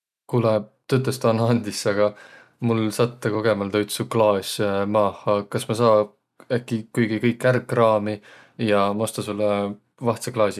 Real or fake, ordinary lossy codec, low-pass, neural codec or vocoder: real; none; 14.4 kHz; none